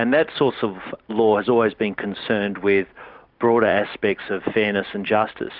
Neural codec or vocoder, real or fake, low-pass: none; real; 5.4 kHz